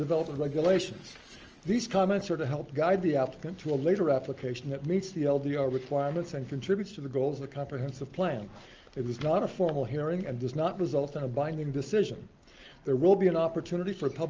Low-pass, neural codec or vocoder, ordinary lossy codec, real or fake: 7.2 kHz; none; Opus, 24 kbps; real